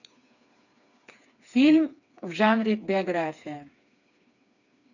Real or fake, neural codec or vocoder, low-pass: fake; codec, 16 kHz, 4 kbps, FreqCodec, smaller model; 7.2 kHz